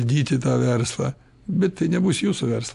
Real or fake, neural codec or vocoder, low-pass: real; none; 10.8 kHz